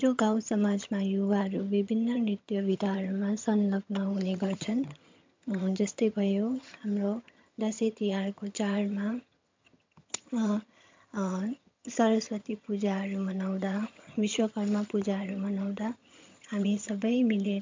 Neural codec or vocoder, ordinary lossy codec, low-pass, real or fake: vocoder, 22.05 kHz, 80 mel bands, HiFi-GAN; MP3, 64 kbps; 7.2 kHz; fake